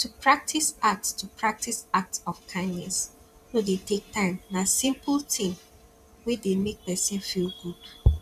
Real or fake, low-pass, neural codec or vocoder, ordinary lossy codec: fake; 14.4 kHz; vocoder, 44.1 kHz, 128 mel bands every 512 samples, BigVGAN v2; none